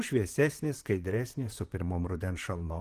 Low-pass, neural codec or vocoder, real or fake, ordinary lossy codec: 14.4 kHz; vocoder, 44.1 kHz, 128 mel bands, Pupu-Vocoder; fake; Opus, 24 kbps